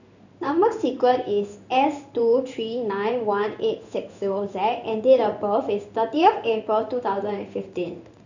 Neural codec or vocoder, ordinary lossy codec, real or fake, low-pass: codec, 16 kHz in and 24 kHz out, 1 kbps, XY-Tokenizer; none; fake; 7.2 kHz